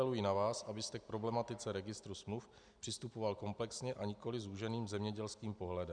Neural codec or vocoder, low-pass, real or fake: none; 9.9 kHz; real